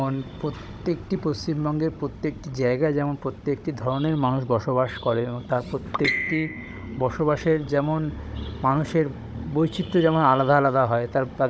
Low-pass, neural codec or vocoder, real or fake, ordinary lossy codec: none; codec, 16 kHz, 16 kbps, FunCodec, trained on Chinese and English, 50 frames a second; fake; none